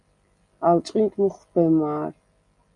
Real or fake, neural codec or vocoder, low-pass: real; none; 10.8 kHz